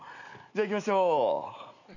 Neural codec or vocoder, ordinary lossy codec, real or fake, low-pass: none; none; real; 7.2 kHz